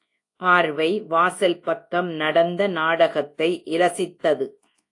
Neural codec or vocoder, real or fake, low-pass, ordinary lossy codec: codec, 24 kHz, 0.9 kbps, WavTokenizer, large speech release; fake; 10.8 kHz; AAC, 48 kbps